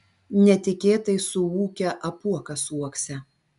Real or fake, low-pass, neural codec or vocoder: real; 10.8 kHz; none